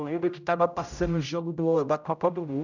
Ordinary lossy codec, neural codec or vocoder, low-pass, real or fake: none; codec, 16 kHz, 0.5 kbps, X-Codec, HuBERT features, trained on general audio; 7.2 kHz; fake